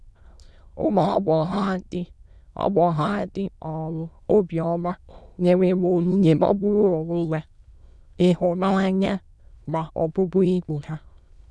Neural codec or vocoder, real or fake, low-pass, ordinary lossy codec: autoencoder, 22.05 kHz, a latent of 192 numbers a frame, VITS, trained on many speakers; fake; none; none